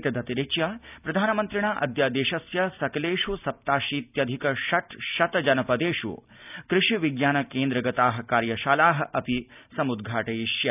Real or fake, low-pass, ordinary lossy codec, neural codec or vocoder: real; 3.6 kHz; none; none